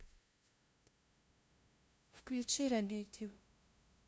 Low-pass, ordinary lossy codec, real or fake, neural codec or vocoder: none; none; fake; codec, 16 kHz, 0.5 kbps, FunCodec, trained on LibriTTS, 25 frames a second